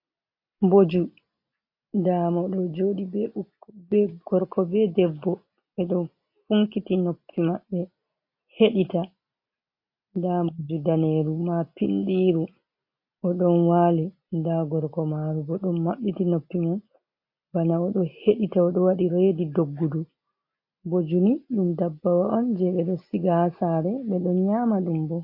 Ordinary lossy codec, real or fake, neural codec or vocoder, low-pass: MP3, 32 kbps; real; none; 5.4 kHz